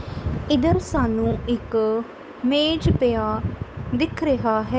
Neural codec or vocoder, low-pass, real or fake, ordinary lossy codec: codec, 16 kHz, 8 kbps, FunCodec, trained on Chinese and English, 25 frames a second; none; fake; none